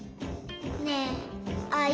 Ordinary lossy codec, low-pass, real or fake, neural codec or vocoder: none; none; real; none